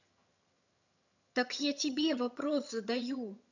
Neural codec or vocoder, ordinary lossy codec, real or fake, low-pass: vocoder, 22.05 kHz, 80 mel bands, HiFi-GAN; none; fake; 7.2 kHz